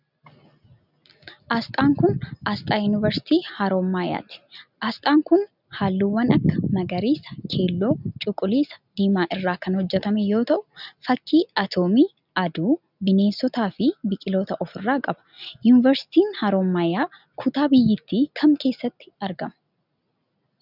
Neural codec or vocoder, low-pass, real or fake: none; 5.4 kHz; real